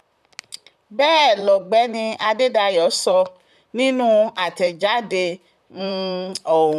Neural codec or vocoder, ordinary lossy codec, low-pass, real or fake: vocoder, 44.1 kHz, 128 mel bands, Pupu-Vocoder; none; 14.4 kHz; fake